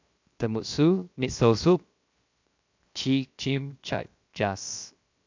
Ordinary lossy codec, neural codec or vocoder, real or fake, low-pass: MP3, 64 kbps; codec, 16 kHz, 0.7 kbps, FocalCodec; fake; 7.2 kHz